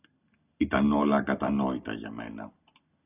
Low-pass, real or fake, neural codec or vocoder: 3.6 kHz; real; none